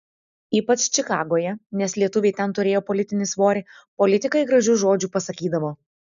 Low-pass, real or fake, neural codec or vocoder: 7.2 kHz; real; none